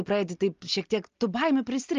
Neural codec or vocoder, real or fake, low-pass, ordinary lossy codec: none; real; 7.2 kHz; Opus, 32 kbps